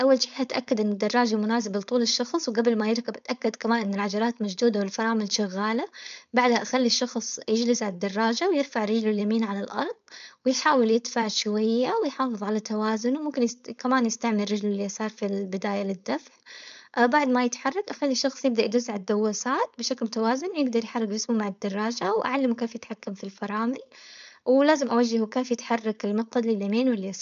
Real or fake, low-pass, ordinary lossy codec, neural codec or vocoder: fake; 7.2 kHz; none; codec, 16 kHz, 4.8 kbps, FACodec